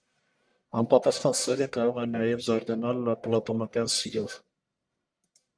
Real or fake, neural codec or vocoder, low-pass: fake; codec, 44.1 kHz, 1.7 kbps, Pupu-Codec; 9.9 kHz